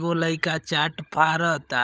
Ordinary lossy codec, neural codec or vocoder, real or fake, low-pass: none; codec, 16 kHz, 16 kbps, FunCodec, trained on LibriTTS, 50 frames a second; fake; none